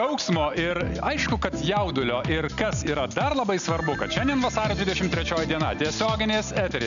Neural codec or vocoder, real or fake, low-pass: none; real; 7.2 kHz